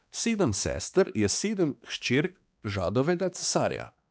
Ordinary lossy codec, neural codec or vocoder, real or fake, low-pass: none; codec, 16 kHz, 2 kbps, X-Codec, HuBERT features, trained on balanced general audio; fake; none